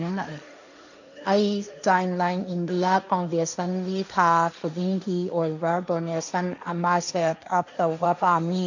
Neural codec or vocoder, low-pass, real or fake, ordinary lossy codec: codec, 16 kHz, 1.1 kbps, Voila-Tokenizer; 7.2 kHz; fake; none